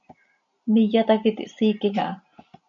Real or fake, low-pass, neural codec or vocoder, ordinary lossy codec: real; 7.2 kHz; none; MP3, 96 kbps